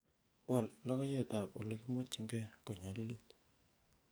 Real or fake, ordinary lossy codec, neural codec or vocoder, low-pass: fake; none; codec, 44.1 kHz, 2.6 kbps, SNAC; none